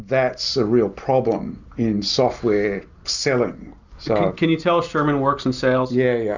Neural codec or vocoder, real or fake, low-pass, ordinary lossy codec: none; real; 7.2 kHz; Opus, 64 kbps